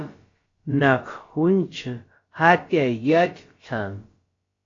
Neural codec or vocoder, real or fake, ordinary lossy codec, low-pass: codec, 16 kHz, about 1 kbps, DyCAST, with the encoder's durations; fake; AAC, 32 kbps; 7.2 kHz